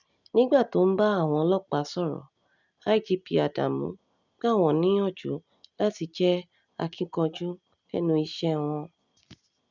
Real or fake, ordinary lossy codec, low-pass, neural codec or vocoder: real; none; 7.2 kHz; none